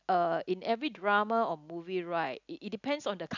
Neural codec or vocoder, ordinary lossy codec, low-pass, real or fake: none; none; 7.2 kHz; real